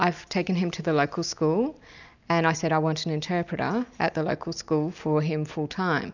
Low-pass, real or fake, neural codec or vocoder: 7.2 kHz; real; none